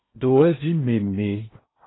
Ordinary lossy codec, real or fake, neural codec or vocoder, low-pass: AAC, 16 kbps; fake; codec, 16 kHz in and 24 kHz out, 0.8 kbps, FocalCodec, streaming, 65536 codes; 7.2 kHz